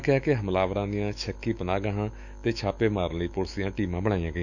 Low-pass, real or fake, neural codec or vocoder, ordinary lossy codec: 7.2 kHz; fake; autoencoder, 48 kHz, 128 numbers a frame, DAC-VAE, trained on Japanese speech; none